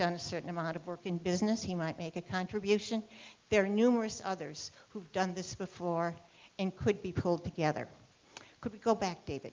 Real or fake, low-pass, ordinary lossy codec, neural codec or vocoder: real; 7.2 kHz; Opus, 32 kbps; none